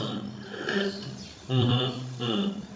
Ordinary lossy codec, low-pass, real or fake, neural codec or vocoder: none; none; fake; codec, 16 kHz, 16 kbps, FreqCodec, larger model